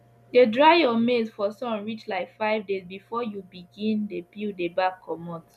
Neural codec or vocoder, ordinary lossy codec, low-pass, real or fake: none; none; 14.4 kHz; real